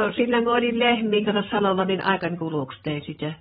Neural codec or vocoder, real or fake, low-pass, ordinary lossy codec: codec, 16 kHz, 16 kbps, FreqCodec, larger model; fake; 7.2 kHz; AAC, 16 kbps